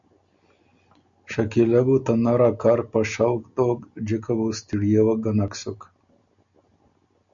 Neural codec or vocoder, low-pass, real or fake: none; 7.2 kHz; real